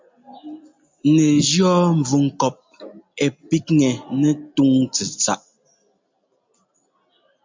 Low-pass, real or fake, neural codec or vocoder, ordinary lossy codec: 7.2 kHz; real; none; MP3, 64 kbps